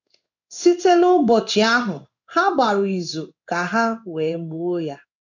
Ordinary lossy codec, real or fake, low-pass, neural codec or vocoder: none; fake; 7.2 kHz; codec, 16 kHz in and 24 kHz out, 1 kbps, XY-Tokenizer